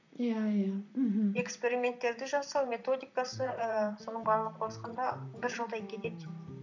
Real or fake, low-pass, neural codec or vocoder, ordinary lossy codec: fake; 7.2 kHz; vocoder, 44.1 kHz, 128 mel bands, Pupu-Vocoder; none